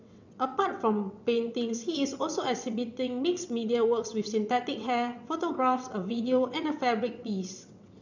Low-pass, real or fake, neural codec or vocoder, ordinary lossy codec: 7.2 kHz; fake; vocoder, 22.05 kHz, 80 mel bands, WaveNeXt; none